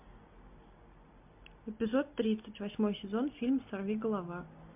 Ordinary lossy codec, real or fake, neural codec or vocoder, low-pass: MP3, 32 kbps; real; none; 3.6 kHz